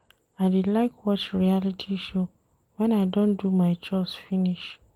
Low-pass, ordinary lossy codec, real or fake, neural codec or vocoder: 19.8 kHz; Opus, 24 kbps; real; none